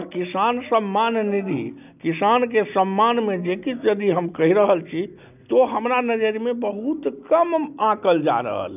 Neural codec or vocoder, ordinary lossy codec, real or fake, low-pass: none; none; real; 3.6 kHz